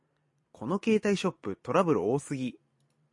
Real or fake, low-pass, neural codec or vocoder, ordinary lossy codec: fake; 10.8 kHz; vocoder, 44.1 kHz, 128 mel bands every 256 samples, BigVGAN v2; MP3, 48 kbps